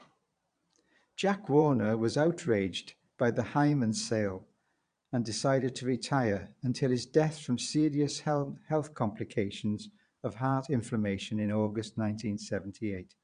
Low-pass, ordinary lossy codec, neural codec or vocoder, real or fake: 9.9 kHz; MP3, 96 kbps; vocoder, 22.05 kHz, 80 mel bands, Vocos; fake